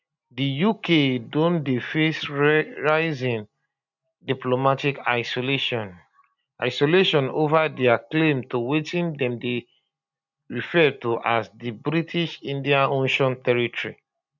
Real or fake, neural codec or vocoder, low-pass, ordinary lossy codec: real; none; 7.2 kHz; none